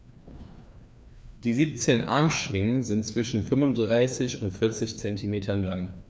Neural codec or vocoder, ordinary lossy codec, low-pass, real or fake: codec, 16 kHz, 2 kbps, FreqCodec, larger model; none; none; fake